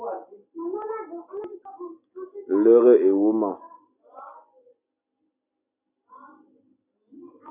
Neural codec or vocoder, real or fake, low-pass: none; real; 3.6 kHz